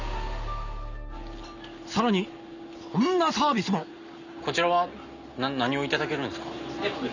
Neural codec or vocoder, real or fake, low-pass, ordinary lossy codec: none; real; 7.2 kHz; none